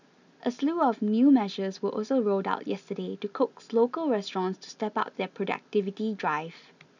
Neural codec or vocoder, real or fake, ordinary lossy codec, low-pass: none; real; none; 7.2 kHz